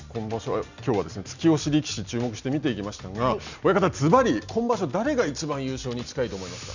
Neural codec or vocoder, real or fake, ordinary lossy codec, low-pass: none; real; none; 7.2 kHz